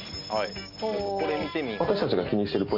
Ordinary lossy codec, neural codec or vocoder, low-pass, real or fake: none; none; 5.4 kHz; real